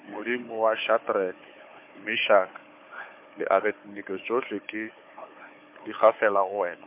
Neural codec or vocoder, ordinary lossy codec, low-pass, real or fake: codec, 16 kHz, 4 kbps, FunCodec, trained on Chinese and English, 50 frames a second; MP3, 32 kbps; 3.6 kHz; fake